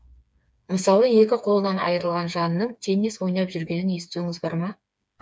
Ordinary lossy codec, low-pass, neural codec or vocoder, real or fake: none; none; codec, 16 kHz, 4 kbps, FreqCodec, smaller model; fake